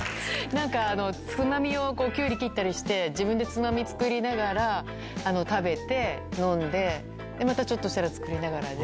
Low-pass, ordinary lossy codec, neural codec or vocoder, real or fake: none; none; none; real